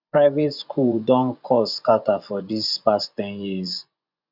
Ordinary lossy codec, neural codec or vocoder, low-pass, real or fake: none; vocoder, 24 kHz, 100 mel bands, Vocos; 5.4 kHz; fake